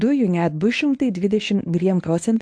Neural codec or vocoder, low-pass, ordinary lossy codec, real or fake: codec, 24 kHz, 0.9 kbps, WavTokenizer, medium speech release version 1; 9.9 kHz; AAC, 64 kbps; fake